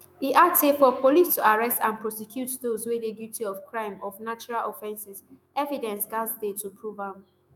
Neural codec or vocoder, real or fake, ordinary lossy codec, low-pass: autoencoder, 48 kHz, 128 numbers a frame, DAC-VAE, trained on Japanese speech; fake; none; none